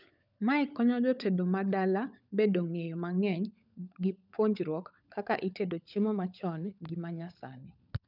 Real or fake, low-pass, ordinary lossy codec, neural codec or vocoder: fake; 5.4 kHz; none; codec, 16 kHz, 16 kbps, FunCodec, trained on LibriTTS, 50 frames a second